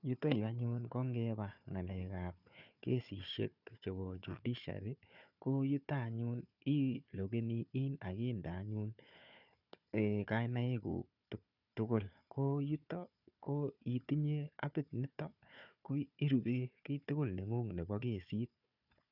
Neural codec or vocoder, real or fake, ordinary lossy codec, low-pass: codec, 16 kHz, 4 kbps, FunCodec, trained on Chinese and English, 50 frames a second; fake; none; 5.4 kHz